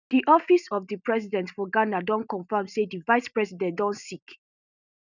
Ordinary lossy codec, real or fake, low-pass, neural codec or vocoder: none; real; 7.2 kHz; none